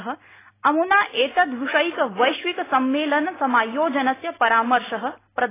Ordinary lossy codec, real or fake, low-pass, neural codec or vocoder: AAC, 16 kbps; real; 3.6 kHz; none